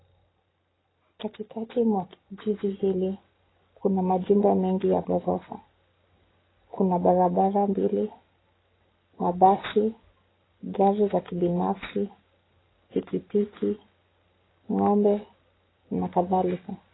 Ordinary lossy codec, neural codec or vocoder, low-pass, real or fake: AAC, 16 kbps; none; 7.2 kHz; real